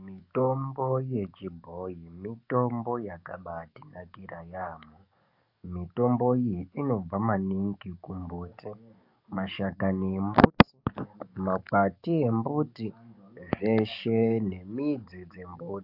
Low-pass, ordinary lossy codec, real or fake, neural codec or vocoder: 5.4 kHz; AAC, 48 kbps; fake; codec, 44.1 kHz, 7.8 kbps, DAC